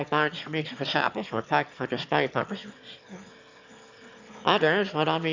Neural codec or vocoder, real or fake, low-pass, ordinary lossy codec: autoencoder, 22.05 kHz, a latent of 192 numbers a frame, VITS, trained on one speaker; fake; 7.2 kHz; MP3, 64 kbps